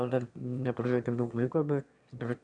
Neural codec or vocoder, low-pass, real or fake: autoencoder, 22.05 kHz, a latent of 192 numbers a frame, VITS, trained on one speaker; 9.9 kHz; fake